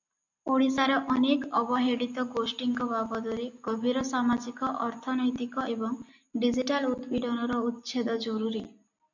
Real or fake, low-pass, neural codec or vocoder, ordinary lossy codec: real; 7.2 kHz; none; MP3, 64 kbps